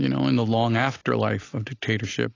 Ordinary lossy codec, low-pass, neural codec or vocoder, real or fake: AAC, 32 kbps; 7.2 kHz; none; real